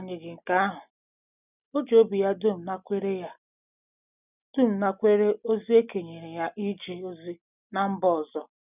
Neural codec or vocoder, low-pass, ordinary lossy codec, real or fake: none; 3.6 kHz; none; real